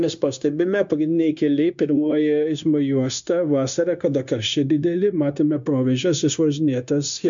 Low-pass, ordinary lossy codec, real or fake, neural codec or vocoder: 7.2 kHz; MP3, 64 kbps; fake; codec, 16 kHz, 0.9 kbps, LongCat-Audio-Codec